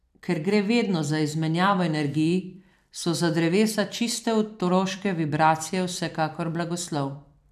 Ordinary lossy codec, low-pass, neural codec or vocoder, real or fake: none; 14.4 kHz; vocoder, 44.1 kHz, 128 mel bands every 256 samples, BigVGAN v2; fake